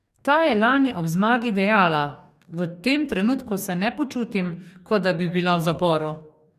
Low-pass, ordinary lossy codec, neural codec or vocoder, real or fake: 14.4 kHz; none; codec, 44.1 kHz, 2.6 kbps, DAC; fake